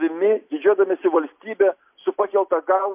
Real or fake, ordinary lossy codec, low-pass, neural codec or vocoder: real; MP3, 32 kbps; 3.6 kHz; none